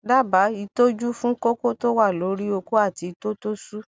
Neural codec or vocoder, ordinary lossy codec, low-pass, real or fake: none; none; none; real